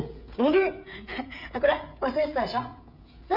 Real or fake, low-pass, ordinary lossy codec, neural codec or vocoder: fake; 5.4 kHz; none; codec, 16 kHz, 16 kbps, FreqCodec, smaller model